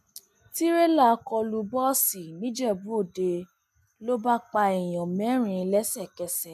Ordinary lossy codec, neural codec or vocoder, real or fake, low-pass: none; none; real; 14.4 kHz